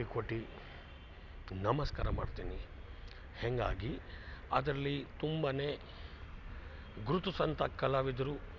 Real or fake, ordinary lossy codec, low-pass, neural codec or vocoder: real; none; 7.2 kHz; none